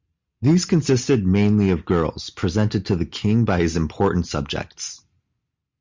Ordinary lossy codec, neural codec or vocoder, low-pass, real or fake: MP3, 64 kbps; none; 7.2 kHz; real